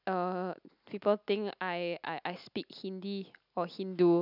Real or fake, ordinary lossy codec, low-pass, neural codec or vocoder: real; none; 5.4 kHz; none